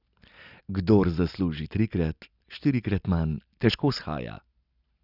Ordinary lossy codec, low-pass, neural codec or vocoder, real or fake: none; 5.4 kHz; none; real